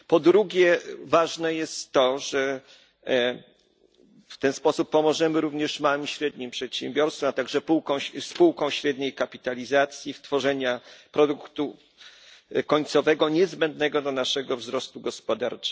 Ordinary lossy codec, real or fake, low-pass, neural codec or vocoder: none; real; none; none